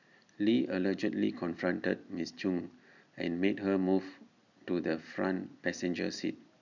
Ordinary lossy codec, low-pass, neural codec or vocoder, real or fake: none; 7.2 kHz; none; real